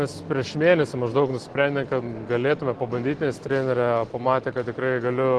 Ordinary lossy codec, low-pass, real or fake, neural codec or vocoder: Opus, 16 kbps; 10.8 kHz; real; none